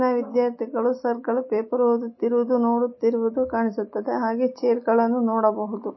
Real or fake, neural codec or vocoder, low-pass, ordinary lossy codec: real; none; 7.2 kHz; MP3, 24 kbps